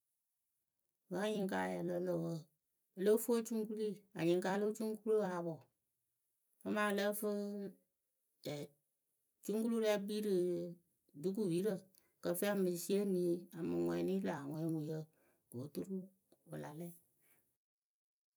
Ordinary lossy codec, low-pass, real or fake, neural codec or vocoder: none; none; fake; vocoder, 44.1 kHz, 128 mel bands every 256 samples, BigVGAN v2